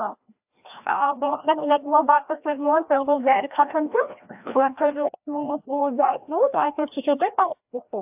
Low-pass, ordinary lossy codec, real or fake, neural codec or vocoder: 3.6 kHz; AAC, 32 kbps; fake; codec, 16 kHz, 1 kbps, FreqCodec, larger model